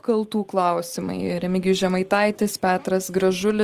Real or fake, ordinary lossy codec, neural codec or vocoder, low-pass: real; Opus, 24 kbps; none; 14.4 kHz